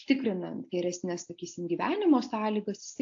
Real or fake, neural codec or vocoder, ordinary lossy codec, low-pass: real; none; AAC, 48 kbps; 7.2 kHz